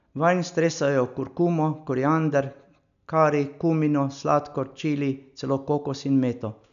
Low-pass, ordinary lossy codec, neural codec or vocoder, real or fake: 7.2 kHz; none; none; real